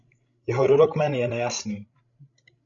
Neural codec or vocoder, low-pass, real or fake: codec, 16 kHz, 16 kbps, FreqCodec, larger model; 7.2 kHz; fake